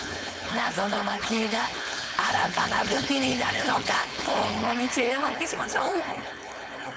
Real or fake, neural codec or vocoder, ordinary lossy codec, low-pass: fake; codec, 16 kHz, 4.8 kbps, FACodec; none; none